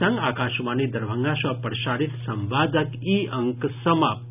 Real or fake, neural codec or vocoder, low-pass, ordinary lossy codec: real; none; 3.6 kHz; none